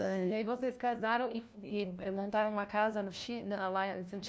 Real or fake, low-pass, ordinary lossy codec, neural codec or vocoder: fake; none; none; codec, 16 kHz, 1 kbps, FunCodec, trained on LibriTTS, 50 frames a second